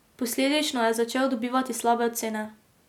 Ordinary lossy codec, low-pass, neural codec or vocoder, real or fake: none; 19.8 kHz; none; real